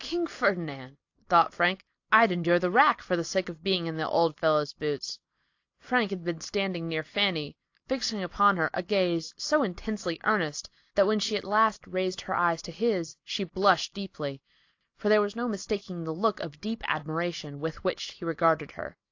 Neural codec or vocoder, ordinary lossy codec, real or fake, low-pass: none; AAC, 48 kbps; real; 7.2 kHz